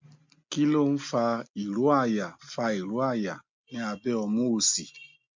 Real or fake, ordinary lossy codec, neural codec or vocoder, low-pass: real; MP3, 64 kbps; none; 7.2 kHz